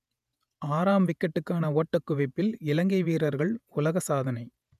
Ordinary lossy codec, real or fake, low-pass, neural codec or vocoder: none; fake; 14.4 kHz; vocoder, 48 kHz, 128 mel bands, Vocos